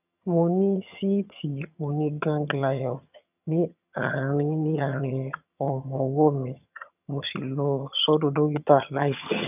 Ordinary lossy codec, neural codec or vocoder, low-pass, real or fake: none; vocoder, 22.05 kHz, 80 mel bands, HiFi-GAN; 3.6 kHz; fake